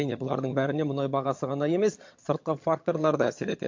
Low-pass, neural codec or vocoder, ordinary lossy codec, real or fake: 7.2 kHz; vocoder, 22.05 kHz, 80 mel bands, HiFi-GAN; MP3, 48 kbps; fake